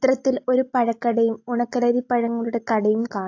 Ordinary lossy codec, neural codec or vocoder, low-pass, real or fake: AAC, 48 kbps; none; 7.2 kHz; real